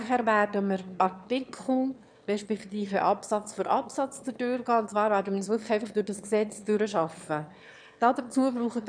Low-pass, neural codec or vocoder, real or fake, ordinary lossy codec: 9.9 kHz; autoencoder, 22.05 kHz, a latent of 192 numbers a frame, VITS, trained on one speaker; fake; none